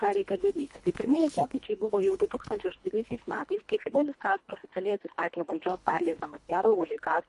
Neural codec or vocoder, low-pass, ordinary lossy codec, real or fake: codec, 24 kHz, 1.5 kbps, HILCodec; 10.8 kHz; MP3, 48 kbps; fake